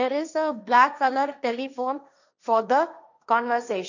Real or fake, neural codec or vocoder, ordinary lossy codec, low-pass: fake; codec, 16 kHz, 1.1 kbps, Voila-Tokenizer; none; 7.2 kHz